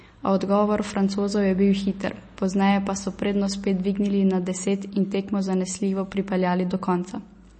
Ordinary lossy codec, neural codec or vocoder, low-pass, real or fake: MP3, 32 kbps; none; 10.8 kHz; real